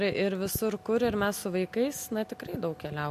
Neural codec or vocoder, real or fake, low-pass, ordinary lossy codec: none; real; 14.4 kHz; MP3, 64 kbps